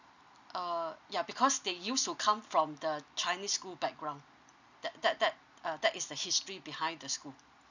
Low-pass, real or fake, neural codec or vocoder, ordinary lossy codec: 7.2 kHz; real; none; none